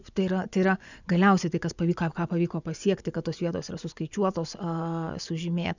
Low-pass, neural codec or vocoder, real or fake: 7.2 kHz; vocoder, 24 kHz, 100 mel bands, Vocos; fake